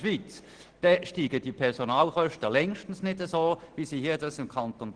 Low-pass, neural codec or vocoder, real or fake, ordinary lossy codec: 9.9 kHz; none; real; Opus, 16 kbps